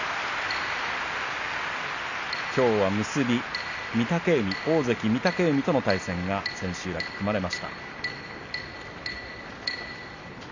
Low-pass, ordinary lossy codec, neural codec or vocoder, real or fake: 7.2 kHz; none; none; real